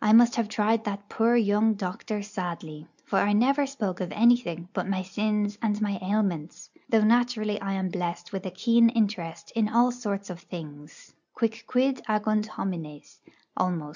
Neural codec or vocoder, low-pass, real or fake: none; 7.2 kHz; real